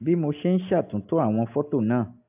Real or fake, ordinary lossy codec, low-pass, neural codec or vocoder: real; AAC, 32 kbps; 3.6 kHz; none